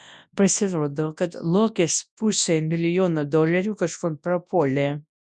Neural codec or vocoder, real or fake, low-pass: codec, 24 kHz, 0.9 kbps, WavTokenizer, large speech release; fake; 10.8 kHz